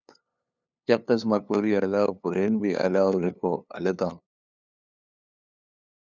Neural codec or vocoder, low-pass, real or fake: codec, 16 kHz, 2 kbps, FunCodec, trained on LibriTTS, 25 frames a second; 7.2 kHz; fake